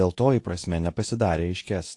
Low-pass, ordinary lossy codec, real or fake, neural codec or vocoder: 10.8 kHz; AAC, 48 kbps; fake; codec, 24 kHz, 0.9 kbps, WavTokenizer, medium speech release version 2